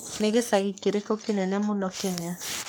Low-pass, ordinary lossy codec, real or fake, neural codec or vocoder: none; none; fake; codec, 44.1 kHz, 3.4 kbps, Pupu-Codec